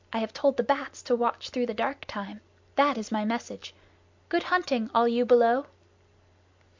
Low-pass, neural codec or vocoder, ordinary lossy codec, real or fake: 7.2 kHz; none; MP3, 64 kbps; real